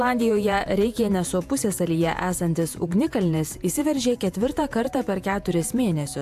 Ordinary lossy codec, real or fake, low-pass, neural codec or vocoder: AAC, 96 kbps; fake; 14.4 kHz; vocoder, 48 kHz, 128 mel bands, Vocos